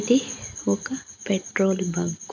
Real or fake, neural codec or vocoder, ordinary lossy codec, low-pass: real; none; none; 7.2 kHz